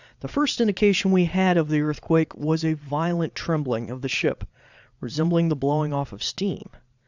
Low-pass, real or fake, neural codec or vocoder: 7.2 kHz; fake; vocoder, 22.05 kHz, 80 mel bands, Vocos